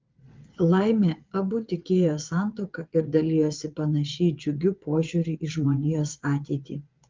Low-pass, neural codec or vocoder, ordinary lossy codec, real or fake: 7.2 kHz; vocoder, 22.05 kHz, 80 mel bands, WaveNeXt; Opus, 24 kbps; fake